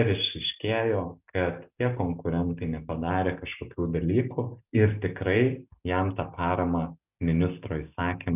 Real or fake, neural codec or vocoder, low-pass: real; none; 3.6 kHz